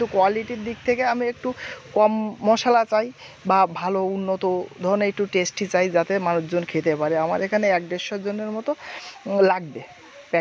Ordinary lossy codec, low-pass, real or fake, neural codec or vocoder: none; none; real; none